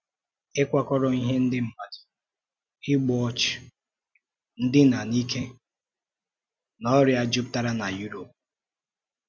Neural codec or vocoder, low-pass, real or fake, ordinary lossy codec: none; none; real; none